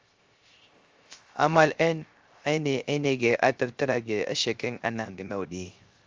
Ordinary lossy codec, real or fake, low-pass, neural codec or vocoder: Opus, 32 kbps; fake; 7.2 kHz; codec, 16 kHz, 0.3 kbps, FocalCodec